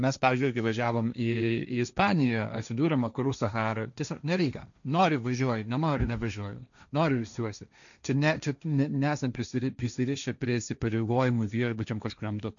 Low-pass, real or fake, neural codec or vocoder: 7.2 kHz; fake; codec, 16 kHz, 1.1 kbps, Voila-Tokenizer